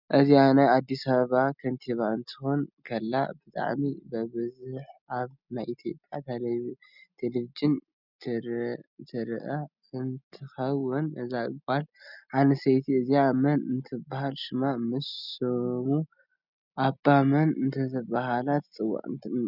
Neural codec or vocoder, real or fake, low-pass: none; real; 5.4 kHz